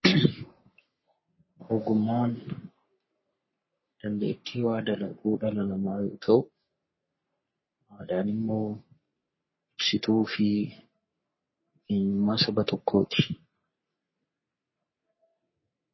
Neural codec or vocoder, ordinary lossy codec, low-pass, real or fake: codec, 44.1 kHz, 3.4 kbps, Pupu-Codec; MP3, 24 kbps; 7.2 kHz; fake